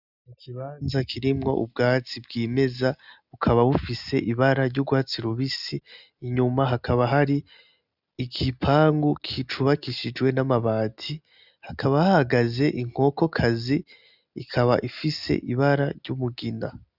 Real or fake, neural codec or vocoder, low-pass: real; none; 5.4 kHz